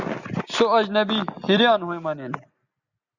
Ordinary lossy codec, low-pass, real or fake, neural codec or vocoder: Opus, 64 kbps; 7.2 kHz; real; none